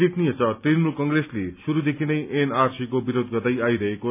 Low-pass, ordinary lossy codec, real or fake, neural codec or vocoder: 3.6 kHz; none; real; none